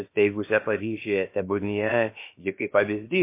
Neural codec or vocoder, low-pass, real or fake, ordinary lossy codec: codec, 16 kHz, about 1 kbps, DyCAST, with the encoder's durations; 3.6 kHz; fake; MP3, 24 kbps